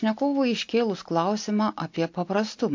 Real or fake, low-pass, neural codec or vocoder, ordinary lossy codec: real; 7.2 kHz; none; MP3, 48 kbps